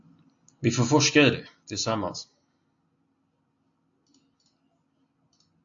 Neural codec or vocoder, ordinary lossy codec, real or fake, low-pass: none; AAC, 64 kbps; real; 7.2 kHz